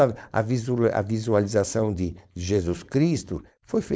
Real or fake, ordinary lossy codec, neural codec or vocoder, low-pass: fake; none; codec, 16 kHz, 4.8 kbps, FACodec; none